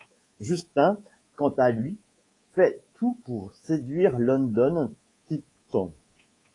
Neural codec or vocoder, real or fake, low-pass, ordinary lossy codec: codec, 24 kHz, 3.1 kbps, DualCodec; fake; 10.8 kHz; AAC, 32 kbps